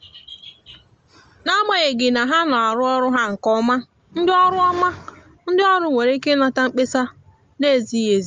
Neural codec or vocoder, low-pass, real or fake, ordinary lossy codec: none; 7.2 kHz; real; Opus, 24 kbps